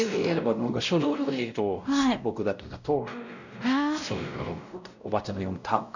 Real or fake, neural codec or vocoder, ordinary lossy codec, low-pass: fake; codec, 16 kHz, 0.5 kbps, X-Codec, WavLM features, trained on Multilingual LibriSpeech; none; 7.2 kHz